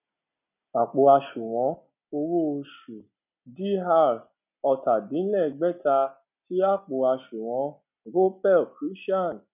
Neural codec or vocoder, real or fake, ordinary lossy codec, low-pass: none; real; none; 3.6 kHz